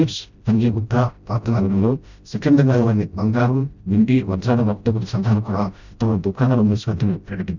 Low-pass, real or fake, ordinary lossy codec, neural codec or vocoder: 7.2 kHz; fake; none; codec, 16 kHz, 0.5 kbps, FreqCodec, smaller model